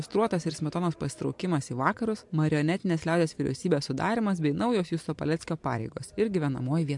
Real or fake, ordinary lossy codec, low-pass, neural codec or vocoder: real; MP3, 64 kbps; 10.8 kHz; none